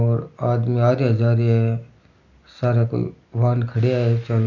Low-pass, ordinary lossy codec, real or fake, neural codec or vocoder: 7.2 kHz; none; real; none